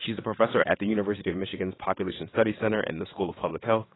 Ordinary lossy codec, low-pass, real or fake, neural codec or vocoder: AAC, 16 kbps; 7.2 kHz; fake; vocoder, 22.05 kHz, 80 mel bands, WaveNeXt